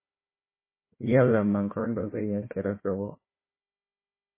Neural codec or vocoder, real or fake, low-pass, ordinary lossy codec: codec, 16 kHz, 1 kbps, FunCodec, trained on Chinese and English, 50 frames a second; fake; 3.6 kHz; MP3, 16 kbps